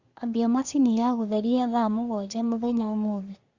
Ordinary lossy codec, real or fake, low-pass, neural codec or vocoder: Opus, 64 kbps; fake; 7.2 kHz; codec, 24 kHz, 1 kbps, SNAC